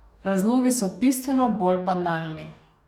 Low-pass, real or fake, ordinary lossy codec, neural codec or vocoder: 19.8 kHz; fake; none; codec, 44.1 kHz, 2.6 kbps, DAC